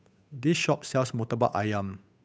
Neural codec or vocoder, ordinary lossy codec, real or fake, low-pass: codec, 16 kHz, 8 kbps, FunCodec, trained on Chinese and English, 25 frames a second; none; fake; none